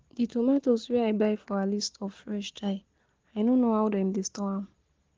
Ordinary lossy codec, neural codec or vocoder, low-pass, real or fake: Opus, 16 kbps; none; 7.2 kHz; real